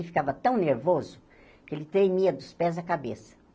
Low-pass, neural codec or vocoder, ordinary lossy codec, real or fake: none; none; none; real